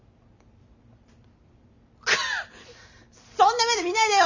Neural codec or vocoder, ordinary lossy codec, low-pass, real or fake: none; none; 7.2 kHz; real